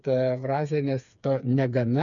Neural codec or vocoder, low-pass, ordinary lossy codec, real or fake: codec, 16 kHz, 4 kbps, FreqCodec, smaller model; 7.2 kHz; MP3, 64 kbps; fake